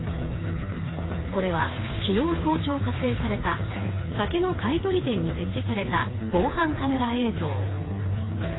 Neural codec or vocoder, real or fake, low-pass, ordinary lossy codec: codec, 16 kHz, 4 kbps, FreqCodec, smaller model; fake; 7.2 kHz; AAC, 16 kbps